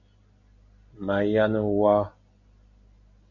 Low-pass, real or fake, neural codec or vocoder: 7.2 kHz; real; none